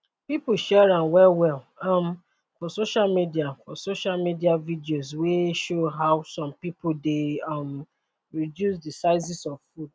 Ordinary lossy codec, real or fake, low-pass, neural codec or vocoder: none; real; none; none